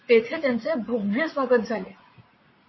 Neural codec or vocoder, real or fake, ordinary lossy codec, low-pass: vocoder, 44.1 kHz, 80 mel bands, Vocos; fake; MP3, 24 kbps; 7.2 kHz